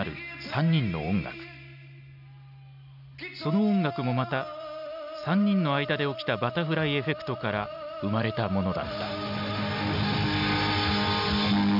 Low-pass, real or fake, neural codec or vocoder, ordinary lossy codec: 5.4 kHz; real; none; none